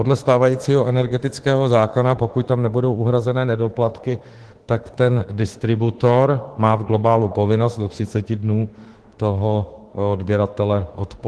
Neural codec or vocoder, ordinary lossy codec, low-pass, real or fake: autoencoder, 48 kHz, 32 numbers a frame, DAC-VAE, trained on Japanese speech; Opus, 16 kbps; 10.8 kHz; fake